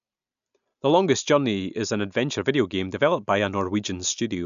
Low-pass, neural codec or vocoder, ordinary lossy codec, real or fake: 7.2 kHz; none; none; real